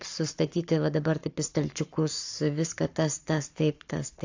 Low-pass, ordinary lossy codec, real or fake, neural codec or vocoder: 7.2 kHz; AAC, 48 kbps; fake; codec, 44.1 kHz, 7.8 kbps, Pupu-Codec